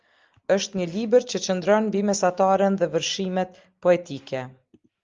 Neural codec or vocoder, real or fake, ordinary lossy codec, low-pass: none; real; Opus, 32 kbps; 7.2 kHz